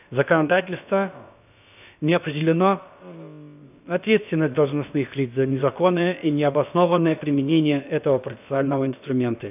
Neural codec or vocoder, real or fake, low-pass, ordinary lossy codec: codec, 16 kHz, about 1 kbps, DyCAST, with the encoder's durations; fake; 3.6 kHz; none